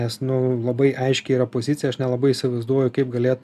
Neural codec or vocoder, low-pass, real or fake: none; 14.4 kHz; real